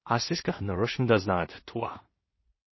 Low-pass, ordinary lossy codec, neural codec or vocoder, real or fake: 7.2 kHz; MP3, 24 kbps; codec, 16 kHz in and 24 kHz out, 0.4 kbps, LongCat-Audio-Codec, two codebook decoder; fake